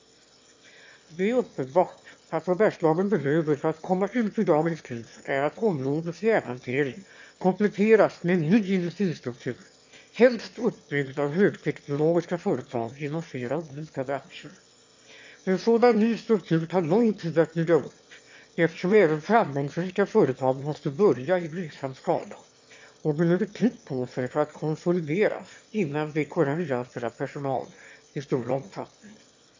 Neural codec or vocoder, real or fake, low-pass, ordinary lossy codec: autoencoder, 22.05 kHz, a latent of 192 numbers a frame, VITS, trained on one speaker; fake; 7.2 kHz; MP3, 48 kbps